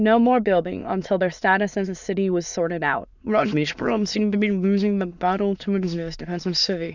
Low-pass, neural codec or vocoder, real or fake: 7.2 kHz; autoencoder, 22.05 kHz, a latent of 192 numbers a frame, VITS, trained on many speakers; fake